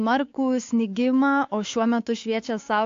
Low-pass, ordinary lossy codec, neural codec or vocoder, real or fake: 7.2 kHz; AAC, 48 kbps; codec, 16 kHz, 6 kbps, DAC; fake